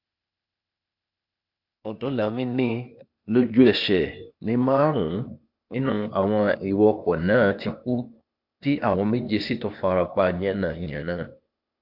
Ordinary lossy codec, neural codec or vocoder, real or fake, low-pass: MP3, 48 kbps; codec, 16 kHz, 0.8 kbps, ZipCodec; fake; 5.4 kHz